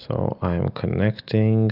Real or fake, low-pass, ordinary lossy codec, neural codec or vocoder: real; 5.4 kHz; Opus, 64 kbps; none